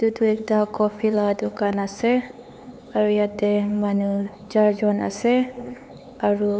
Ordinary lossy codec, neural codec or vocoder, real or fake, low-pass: none; codec, 16 kHz, 4 kbps, X-Codec, HuBERT features, trained on LibriSpeech; fake; none